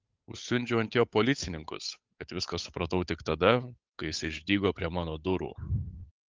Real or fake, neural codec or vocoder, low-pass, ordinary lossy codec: fake; codec, 16 kHz, 8 kbps, FunCodec, trained on Chinese and English, 25 frames a second; 7.2 kHz; Opus, 32 kbps